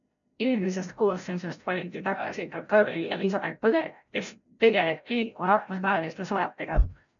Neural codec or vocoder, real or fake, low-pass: codec, 16 kHz, 0.5 kbps, FreqCodec, larger model; fake; 7.2 kHz